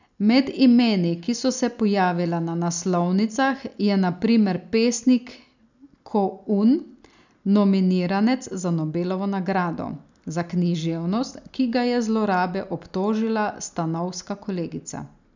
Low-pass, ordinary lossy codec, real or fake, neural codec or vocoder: 7.2 kHz; none; real; none